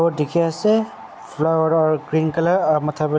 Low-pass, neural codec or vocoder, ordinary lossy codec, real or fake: none; none; none; real